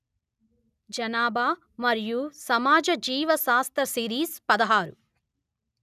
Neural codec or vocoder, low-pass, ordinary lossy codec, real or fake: none; 14.4 kHz; none; real